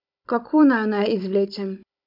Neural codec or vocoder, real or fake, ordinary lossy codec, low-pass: codec, 16 kHz, 16 kbps, FunCodec, trained on Chinese and English, 50 frames a second; fake; none; 5.4 kHz